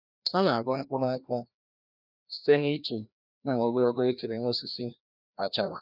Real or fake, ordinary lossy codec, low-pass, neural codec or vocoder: fake; none; 5.4 kHz; codec, 16 kHz, 1 kbps, FreqCodec, larger model